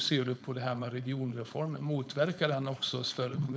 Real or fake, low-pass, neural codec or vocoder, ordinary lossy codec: fake; none; codec, 16 kHz, 4.8 kbps, FACodec; none